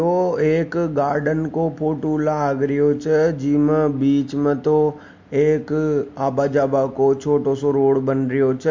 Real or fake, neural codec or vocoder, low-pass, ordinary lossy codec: real; none; 7.2 kHz; MP3, 48 kbps